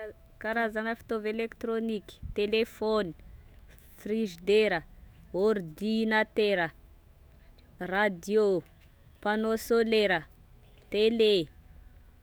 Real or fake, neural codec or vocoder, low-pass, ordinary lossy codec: fake; autoencoder, 48 kHz, 128 numbers a frame, DAC-VAE, trained on Japanese speech; none; none